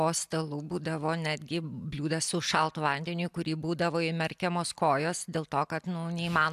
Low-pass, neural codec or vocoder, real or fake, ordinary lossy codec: 14.4 kHz; none; real; AAC, 96 kbps